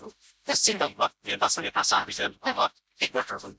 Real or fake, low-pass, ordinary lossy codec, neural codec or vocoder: fake; none; none; codec, 16 kHz, 0.5 kbps, FreqCodec, smaller model